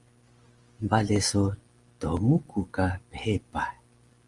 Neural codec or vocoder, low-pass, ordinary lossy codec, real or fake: none; 10.8 kHz; Opus, 24 kbps; real